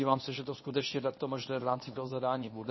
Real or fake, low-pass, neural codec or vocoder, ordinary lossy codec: fake; 7.2 kHz; codec, 24 kHz, 0.9 kbps, WavTokenizer, medium speech release version 1; MP3, 24 kbps